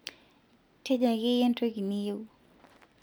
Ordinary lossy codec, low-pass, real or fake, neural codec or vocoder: none; none; real; none